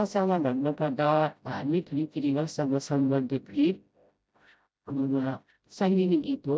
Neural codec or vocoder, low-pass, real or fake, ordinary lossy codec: codec, 16 kHz, 0.5 kbps, FreqCodec, smaller model; none; fake; none